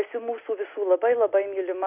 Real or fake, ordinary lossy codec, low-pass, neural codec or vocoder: real; AAC, 32 kbps; 3.6 kHz; none